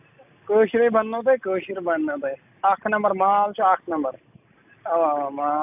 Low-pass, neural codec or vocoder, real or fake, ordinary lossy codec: 3.6 kHz; none; real; AAC, 32 kbps